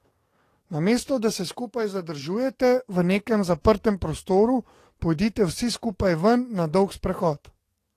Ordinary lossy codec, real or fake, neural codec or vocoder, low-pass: AAC, 48 kbps; fake; codec, 44.1 kHz, 7.8 kbps, DAC; 14.4 kHz